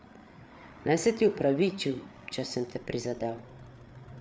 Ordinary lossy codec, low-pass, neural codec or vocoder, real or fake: none; none; codec, 16 kHz, 8 kbps, FreqCodec, larger model; fake